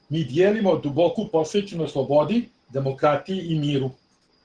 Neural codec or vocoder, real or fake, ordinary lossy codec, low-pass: none; real; Opus, 16 kbps; 9.9 kHz